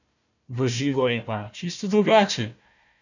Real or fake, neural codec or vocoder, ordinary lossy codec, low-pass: fake; codec, 16 kHz, 1 kbps, FunCodec, trained on Chinese and English, 50 frames a second; none; 7.2 kHz